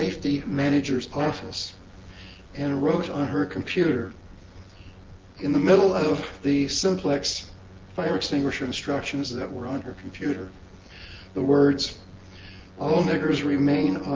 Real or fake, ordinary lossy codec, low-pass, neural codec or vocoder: fake; Opus, 16 kbps; 7.2 kHz; vocoder, 24 kHz, 100 mel bands, Vocos